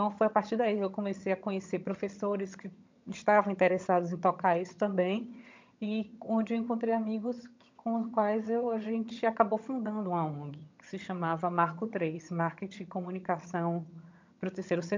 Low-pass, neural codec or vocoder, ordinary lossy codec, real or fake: 7.2 kHz; vocoder, 22.05 kHz, 80 mel bands, HiFi-GAN; MP3, 64 kbps; fake